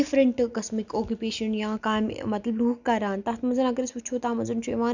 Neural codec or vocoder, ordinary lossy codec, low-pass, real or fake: none; none; 7.2 kHz; real